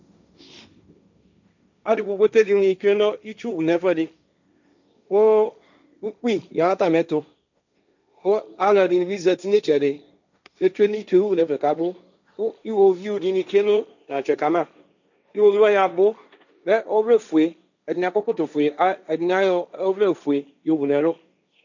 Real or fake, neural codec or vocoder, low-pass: fake; codec, 16 kHz, 1.1 kbps, Voila-Tokenizer; 7.2 kHz